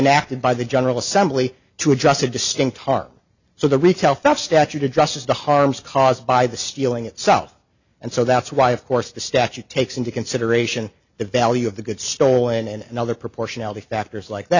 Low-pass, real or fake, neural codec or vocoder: 7.2 kHz; real; none